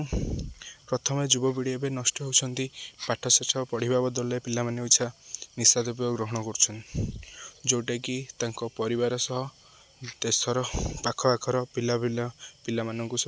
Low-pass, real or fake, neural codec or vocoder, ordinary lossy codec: none; real; none; none